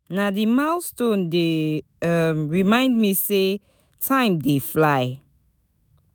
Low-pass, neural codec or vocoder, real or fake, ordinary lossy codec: none; autoencoder, 48 kHz, 128 numbers a frame, DAC-VAE, trained on Japanese speech; fake; none